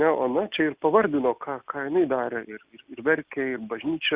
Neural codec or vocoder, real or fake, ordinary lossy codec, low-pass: none; real; Opus, 64 kbps; 3.6 kHz